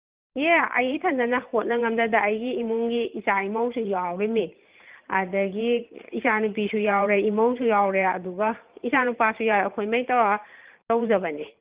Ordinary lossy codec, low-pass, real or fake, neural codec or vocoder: Opus, 32 kbps; 3.6 kHz; fake; vocoder, 44.1 kHz, 128 mel bands every 512 samples, BigVGAN v2